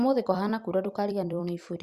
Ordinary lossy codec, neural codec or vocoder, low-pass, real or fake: Opus, 64 kbps; vocoder, 44.1 kHz, 128 mel bands every 256 samples, BigVGAN v2; 14.4 kHz; fake